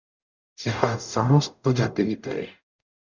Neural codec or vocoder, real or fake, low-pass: codec, 44.1 kHz, 0.9 kbps, DAC; fake; 7.2 kHz